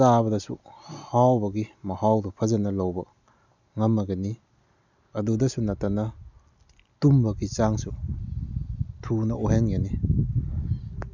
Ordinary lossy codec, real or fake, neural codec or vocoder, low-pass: none; real; none; 7.2 kHz